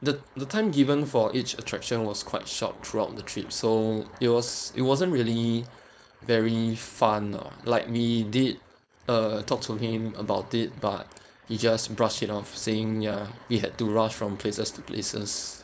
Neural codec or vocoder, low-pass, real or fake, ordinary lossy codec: codec, 16 kHz, 4.8 kbps, FACodec; none; fake; none